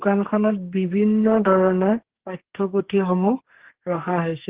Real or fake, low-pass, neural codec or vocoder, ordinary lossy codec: fake; 3.6 kHz; codec, 44.1 kHz, 2.6 kbps, SNAC; Opus, 16 kbps